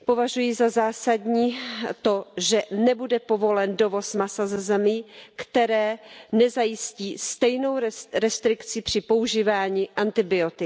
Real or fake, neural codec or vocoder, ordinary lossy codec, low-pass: real; none; none; none